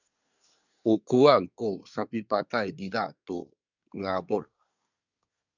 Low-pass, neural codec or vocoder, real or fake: 7.2 kHz; codec, 24 kHz, 1 kbps, SNAC; fake